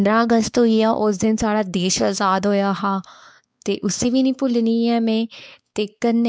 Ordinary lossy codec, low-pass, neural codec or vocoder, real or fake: none; none; codec, 16 kHz, 4 kbps, X-Codec, WavLM features, trained on Multilingual LibriSpeech; fake